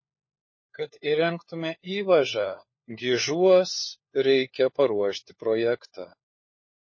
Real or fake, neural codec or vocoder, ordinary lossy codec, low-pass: fake; codec, 16 kHz, 4 kbps, FunCodec, trained on LibriTTS, 50 frames a second; MP3, 32 kbps; 7.2 kHz